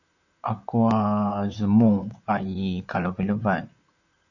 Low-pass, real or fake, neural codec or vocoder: 7.2 kHz; fake; vocoder, 44.1 kHz, 128 mel bands, Pupu-Vocoder